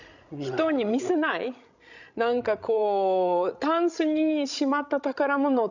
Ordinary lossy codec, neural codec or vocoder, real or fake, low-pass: none; codec, 16 kHz, 16 kbps, FreqCodec, larger model; fake; 7.2 kHz